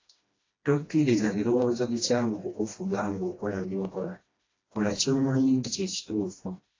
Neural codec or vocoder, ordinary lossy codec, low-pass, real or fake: codec, 16 kHz, 1 kbps, FreqCodec, smaller model; AAC, 32 kbps; 7.2 kHz; fake